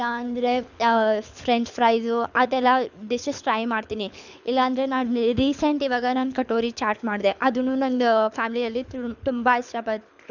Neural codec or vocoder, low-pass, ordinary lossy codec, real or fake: codec, 24 kHz, 6 kbps, HILCodec; 7.2 kHz; none; fake